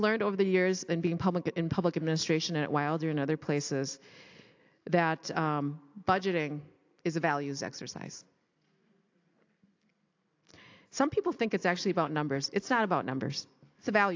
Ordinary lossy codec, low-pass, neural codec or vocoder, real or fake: AAC, 48 kbps; 7.2 kHz; none; real